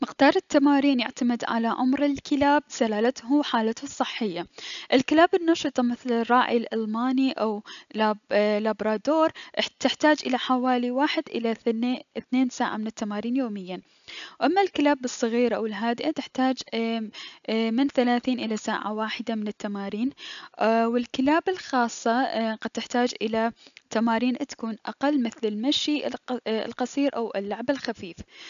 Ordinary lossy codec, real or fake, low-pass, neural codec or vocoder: none; real; 7.2 kHz; none